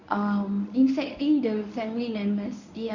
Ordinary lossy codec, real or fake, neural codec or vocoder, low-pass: none; fake; codec, 24 kHz, 0.9 kbps, WavTokenizer, medium speech release version 1; 7.2 kHz